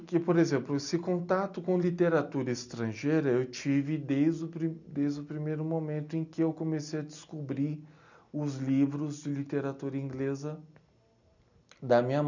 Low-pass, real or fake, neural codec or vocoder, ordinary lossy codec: 7.2 kHz; real; none; none